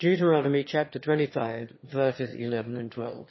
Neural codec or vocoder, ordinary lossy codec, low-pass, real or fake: autoencoder, 22.05 kHz, a latent of 192 numbers a frame, VITS, trained on one speaker; MP3, 24 kbps; 7.2 kHz; fake